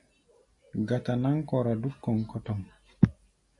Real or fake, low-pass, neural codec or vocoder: real; 10.8 kHz; none